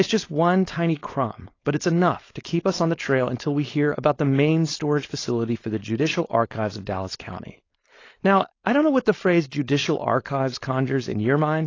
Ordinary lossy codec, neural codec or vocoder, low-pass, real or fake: AAC, 32 kbps; codec, 16 kHz, 4.8 kbps, FACodec; 7.2 kHz; fake